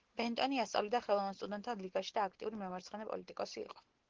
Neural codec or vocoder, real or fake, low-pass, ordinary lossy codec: none; real; 7.2 kHz; Opus, 16 kbps